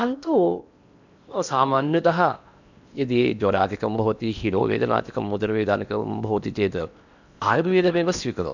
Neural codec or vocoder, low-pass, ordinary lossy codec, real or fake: codec, 16 kHz in and 24 kHz out, 0.8 kbps, FocalCodec, streaming, 65536 codes; 7.2 kHz; none; fake